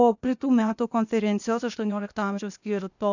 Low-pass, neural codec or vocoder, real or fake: 7.2 kHz; codec, 16 kHz, 0.8 kbps, ZipCodec; fake